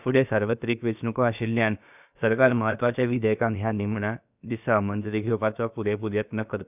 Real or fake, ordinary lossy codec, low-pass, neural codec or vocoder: fake; none; 3.6 kHz; codec, 16 kHz, about 1 kbps, DyCAST, with the encoder's durations